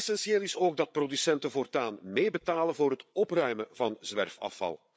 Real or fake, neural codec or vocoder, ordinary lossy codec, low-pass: fake; codec, 16 kHz, 8 kbps, FreqCodec, larger model; none; none